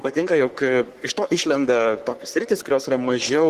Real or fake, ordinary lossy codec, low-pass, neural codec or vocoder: fake; Opus, 16 kbps; 14.4 kHz; codec, 44.1 kHz, 3.4 kbps, Pupu-Codec